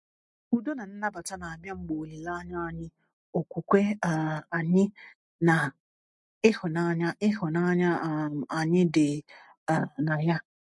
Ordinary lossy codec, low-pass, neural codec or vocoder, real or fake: MP3, 48 kbps; 10.8 kHz; none; real